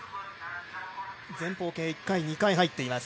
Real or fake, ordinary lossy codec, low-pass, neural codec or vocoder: real; none; none; none